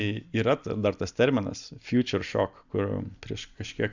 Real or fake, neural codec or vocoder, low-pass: real; none; 7.2 kHz